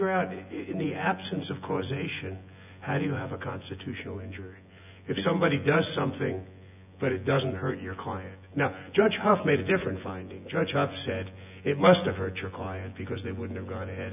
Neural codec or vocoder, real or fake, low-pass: vocoder, 24 kHz, 100 mel bands, Vocos; fake; 3.6 kHz